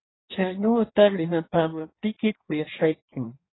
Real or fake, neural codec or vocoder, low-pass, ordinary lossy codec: fake; codec, 16 kHz in and 24 kHz out, 1.1 kbps, FireRedTTS-2 codec; 7.2 kHz; AAC, 16 kbps